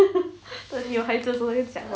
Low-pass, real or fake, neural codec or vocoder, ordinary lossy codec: none; real; none; none